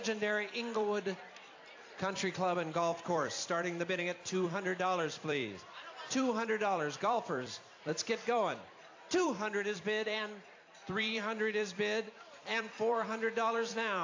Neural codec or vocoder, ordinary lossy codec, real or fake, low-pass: vocoder, 44.1 kHz, 128 mel bands every 256 samples, BigVGAN v2; AAC, 48 kbps; fake; 7.2 kHz